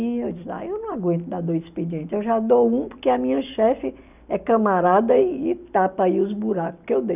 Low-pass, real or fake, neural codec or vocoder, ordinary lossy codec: 3.6 kHz; real; none; none